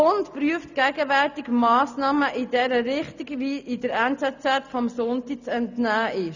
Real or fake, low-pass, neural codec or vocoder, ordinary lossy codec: real; 7.2 kHz; none; none